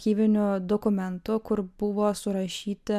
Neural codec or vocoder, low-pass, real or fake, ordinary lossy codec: none; 14.4 kHz; real; MP3, 96 kbps